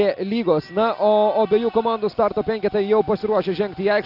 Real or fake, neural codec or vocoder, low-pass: real; none; 5.4 kHz